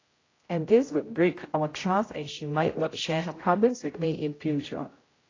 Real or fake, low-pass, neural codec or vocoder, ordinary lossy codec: fake; 7.2 kHz; codec, 16 kHz, 0.5 kbps, X-Codec, HuBERT features, trained on general audio; AAC, 32 kbps